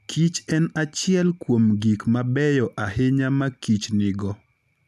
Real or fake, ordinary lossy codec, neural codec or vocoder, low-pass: real; none; none; 14.4 kHz